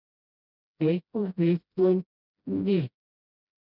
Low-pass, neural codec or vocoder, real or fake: 5.4 kHz; codec, 16 kHz, 0.5 kbps, FreqCodec, smaller model; fake